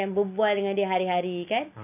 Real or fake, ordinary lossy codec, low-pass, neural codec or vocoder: real; none; 3.6 kHz; none